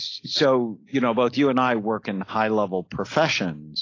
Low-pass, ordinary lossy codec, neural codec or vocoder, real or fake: 7.2 kHz; AAC, 32 kbps; none; real